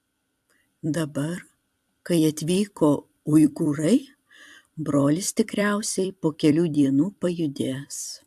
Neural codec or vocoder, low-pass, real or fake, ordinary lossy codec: vocoder, 44.1 kHz, 128 mel bands every 256 samples, BigVGAN v2; 14.4 kHz; fake; AAC, 96 kbps